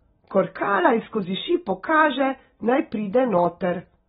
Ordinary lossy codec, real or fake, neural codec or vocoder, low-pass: AAC, 16 kbps; real; none; 19.8 kHz